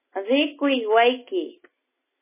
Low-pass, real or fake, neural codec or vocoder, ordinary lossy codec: 3.6 kHz; real; none; MP3, 16 kbps